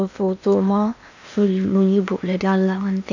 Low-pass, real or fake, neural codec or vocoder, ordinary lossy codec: 7.2 kHz; fake; codec, 16 kHz in and 24 kHz out, 0.8 kbps, FocalCodec, streaming, 65536 codes; none